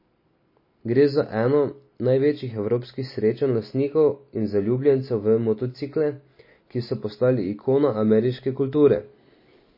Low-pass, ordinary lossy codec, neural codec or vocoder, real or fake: 5.4 kHz; MP3, 24 kbps; none; real